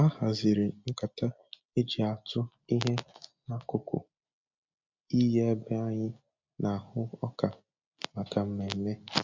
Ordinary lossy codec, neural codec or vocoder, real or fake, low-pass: MP3, 64 kbps; none; real; 7.2 kHz